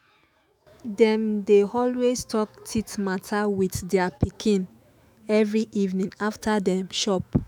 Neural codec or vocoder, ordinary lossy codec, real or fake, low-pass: autoencoder, 48 kHz, 128 numbers a frame, DAC-VAE, trained on Japanese speech; none; fake; none